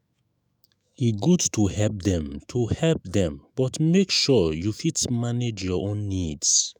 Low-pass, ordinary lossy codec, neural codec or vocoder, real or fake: none; none; autoencoder, 48 kHz, 128 numbers a frame, DAC-VAE, trained on Japanese speech; fake